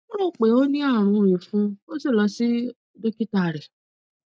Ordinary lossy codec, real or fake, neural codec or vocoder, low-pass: none; real; none; none